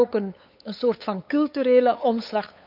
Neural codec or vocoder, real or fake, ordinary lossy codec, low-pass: codec, 16 kHz, 8 kbps, FunCodec, trained on LibriTTS, 25 frames a second; fake; none; 5.4 kHz